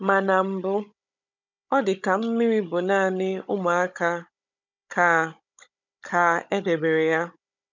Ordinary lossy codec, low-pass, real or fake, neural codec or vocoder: none; 7.2 kHz; fake; codec, 16 kHz, 16 kbps, FunCodec, trained on Chinese and English, 50 frames a second